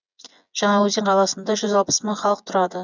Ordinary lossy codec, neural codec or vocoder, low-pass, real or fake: none; vocoder, 24 kHz, 100 mel bands, Vocos; 7.2 kHz; fake